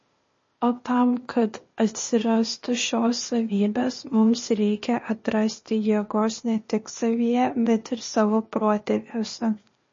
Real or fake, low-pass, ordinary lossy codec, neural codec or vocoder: fake; 7.2 kHz; MP3, 32 kbps; codec, 16 kHz, 0.8 kbps, ZipCodec